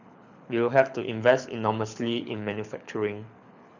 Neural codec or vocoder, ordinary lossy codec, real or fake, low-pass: codec, 24 kHz, 6 kbps, HILCodec; none; fake; 7.2 kHz